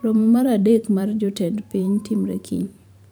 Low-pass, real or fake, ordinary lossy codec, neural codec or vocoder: none; fake; none; vocoder, 44.1 kHz, 128 mel bands every 512 samples, BigVGAN v2